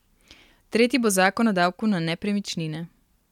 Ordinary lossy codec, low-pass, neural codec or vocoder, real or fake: MP3, 96 kbps; 19.8 kHz; none; real